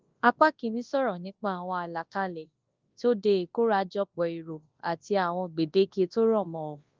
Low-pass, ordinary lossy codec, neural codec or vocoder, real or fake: 7.2 kHz; Opus, 32 kbps; codec, 24 kHz, 0.9 kbps, WavTokenizer, large speech release; fake